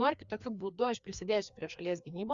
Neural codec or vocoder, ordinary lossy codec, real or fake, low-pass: codec, 16 kHz, 2 kbps, FreqCodec, larger model; Opus, 64 kbps; fake; 7.2 kHz